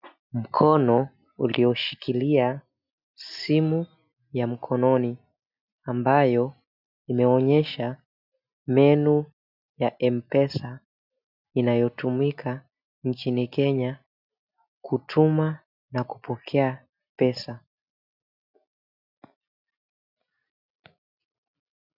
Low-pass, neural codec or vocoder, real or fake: 5.4 kHz; none; real